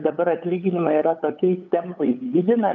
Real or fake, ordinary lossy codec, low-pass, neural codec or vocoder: fake; AAC, 64 kbps; 7.2 kHz; codec, 16 kHz, 8 kbps, FunCodec, trained on LibriTTS, 25 frames a second